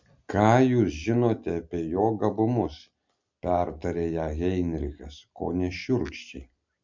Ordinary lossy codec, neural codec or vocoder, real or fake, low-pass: MP3, 64 kbps; none; real; 7.2 kHz